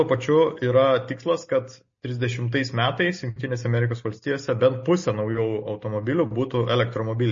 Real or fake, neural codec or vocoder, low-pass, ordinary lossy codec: real; none; 7.2 kHz; MP3, 32 kbps